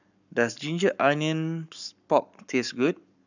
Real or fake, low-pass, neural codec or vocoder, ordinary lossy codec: fake; 7.2 kHz; codec, 44.1 kHz, 7.8 kbps, Pupu-Codec; none